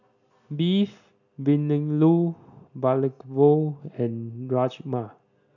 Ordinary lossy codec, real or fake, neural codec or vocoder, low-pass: none; real; none; 7.2 kHz